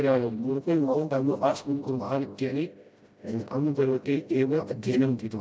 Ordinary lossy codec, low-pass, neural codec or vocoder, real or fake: none; none; codec, 16 kHz, 0.5 kbps, FreqCodec, smaller model; fake